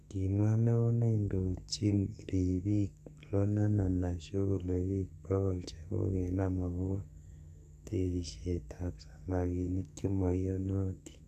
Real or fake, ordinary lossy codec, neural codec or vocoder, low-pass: fake; none; codec, 44.1 kHz, 2.6 kbps, SNAC; 14.4 kHz